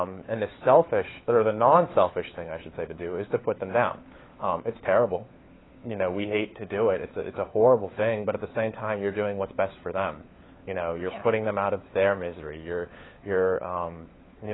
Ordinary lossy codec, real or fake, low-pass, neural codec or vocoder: AAC, 16 kbps; fake; 7.2 kHz; codec, 16 kHz, 4 kbps, FunCodec, trained on LibriTTS, 50 frames a second